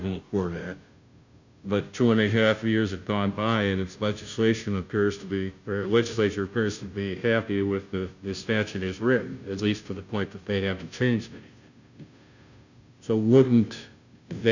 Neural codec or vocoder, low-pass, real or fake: codec, 16 kHz, 0.5 kbps, FunCodec, trained on Chinese and English, 25 frames a second; 7.2 kHz; fake